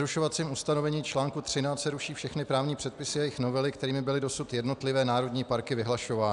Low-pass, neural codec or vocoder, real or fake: 10.8 kHz; none; real